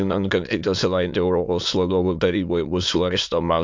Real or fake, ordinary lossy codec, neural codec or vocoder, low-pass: fake; MP3, 64 kbps; autoencoder, 22.05 kHz, a latent of 192 numbers a frame, VITS, trained on many speakers; 7.2 kHz